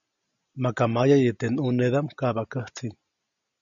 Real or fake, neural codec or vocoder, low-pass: real; none; 7.2 kHz